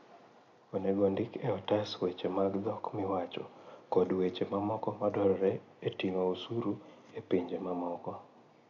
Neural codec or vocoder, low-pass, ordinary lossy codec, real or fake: none; 7.2 kHz; AAC, 48 kbps; real